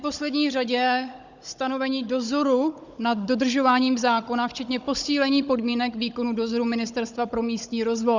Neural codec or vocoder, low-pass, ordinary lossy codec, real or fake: codec, 16 kHz, 16 kbps, FunCodec, trained on Chinese and English, 50 frames a second; 7.2 kHz; Opus, 64 kbps; fake